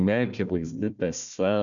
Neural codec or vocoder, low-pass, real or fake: codec, 16 kHz, 1 kbps, FunCodec, trained on Chinese and English, 50 frames a second; 7.2 kHz; fake